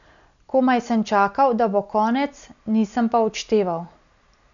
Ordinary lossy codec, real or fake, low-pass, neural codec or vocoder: none; real; 7.2 kHz; none